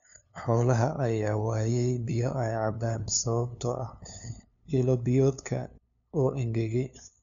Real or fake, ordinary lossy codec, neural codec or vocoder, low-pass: fake; none; codec, 16 kHz, 2 kbps, FunCodec, trained on LibriTTS, 25 frames a second; 7.2 kHz